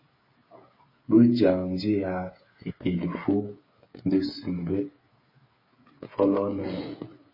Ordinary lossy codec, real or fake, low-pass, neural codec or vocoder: MP3, 24 kbps; fake; 5.4 kHz; codec, 16 kHz, 8 kbps, FreqCodec, smaller model